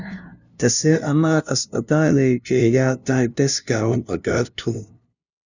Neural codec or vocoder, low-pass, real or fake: codec, 16 kHz, 0.5 kbps, FunCodec, trained on LibriTTS, 25 frames a second; 7.2 kHz; fake